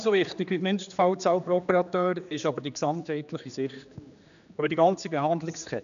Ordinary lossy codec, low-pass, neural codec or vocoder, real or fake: none; 7.2 kHz; codec, 16 kHz, 2 kbps, X-Codec, HuBERT features, trained on general audio; fake